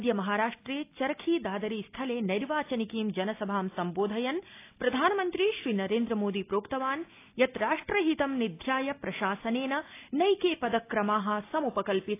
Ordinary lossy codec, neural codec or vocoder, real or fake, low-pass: AAC, 24 kbps; none; real; 3.6 kHz